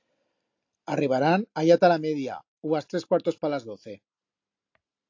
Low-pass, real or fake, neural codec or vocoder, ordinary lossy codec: 7.2 kHz; real; none; AAC, 48 kbps